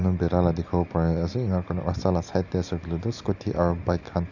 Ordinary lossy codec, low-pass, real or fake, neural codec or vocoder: none; 7.2 kHz; real; none